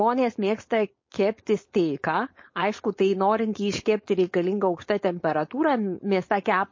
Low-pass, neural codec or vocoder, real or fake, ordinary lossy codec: 7.2 kHz; codec, 16 kHz, 4.8 kbps, FACodec; fake; MP3, 32 kbps